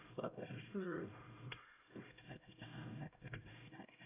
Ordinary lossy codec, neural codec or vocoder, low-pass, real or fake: none; codec, 16 kHz, 0.5 kbps, X-Codec, HuBERT features, trained on LibriSpeech; 3.6 kHz; fake